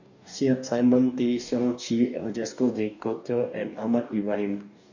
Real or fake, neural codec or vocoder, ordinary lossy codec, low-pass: fake; codec, 44.1 kHz, 2.6 kbps, DAC; none; 7.2 kHz